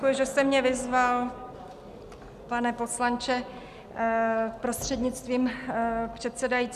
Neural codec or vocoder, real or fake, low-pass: none; real; 14.4 kHz